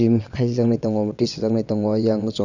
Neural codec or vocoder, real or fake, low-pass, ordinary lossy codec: vocoder, 22.05 kHz, 80 mel bands, WaveNeXt; fake; 7.2 kHz; none